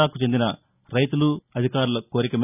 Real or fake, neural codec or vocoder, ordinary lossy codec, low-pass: real; none; none; 3.6 kHz